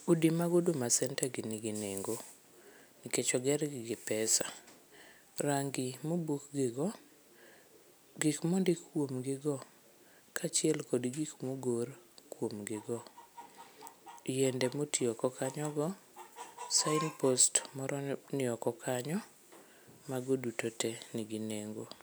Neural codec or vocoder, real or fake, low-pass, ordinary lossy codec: none; real; none; none